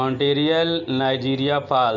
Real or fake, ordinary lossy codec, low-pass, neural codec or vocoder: real; none; 7.2 kHz; none